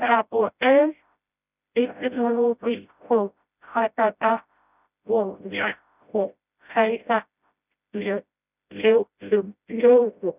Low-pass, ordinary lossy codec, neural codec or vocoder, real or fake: 3.6 kHz; none; codec, 16 kHz, 0.5 kbps, FreqCodec, smaller model; fake